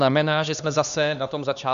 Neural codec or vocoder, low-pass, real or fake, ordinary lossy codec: codec, 16 kHz, 2 kbps, X-Codec, HuBERT features, trained on LibriSpeech; 7.2 kHz; fake; AAC, 96 kbps